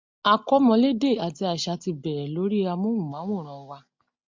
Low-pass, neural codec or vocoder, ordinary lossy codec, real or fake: 7.2 kHz; none; MP3, 64 kbps; real